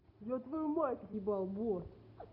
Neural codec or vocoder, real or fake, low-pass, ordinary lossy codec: none; real; 5.4 kHz; none